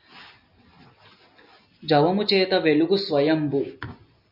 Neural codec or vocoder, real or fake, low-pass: none; real; 5.4 kHz